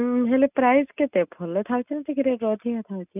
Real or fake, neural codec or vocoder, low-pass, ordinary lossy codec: real; none; 3.6 kHz; none